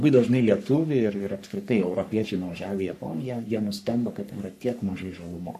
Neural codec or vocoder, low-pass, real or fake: codec, 44.1 kHz, 3.4 kbps, Pupu-Codec; 14.4 kHz; fake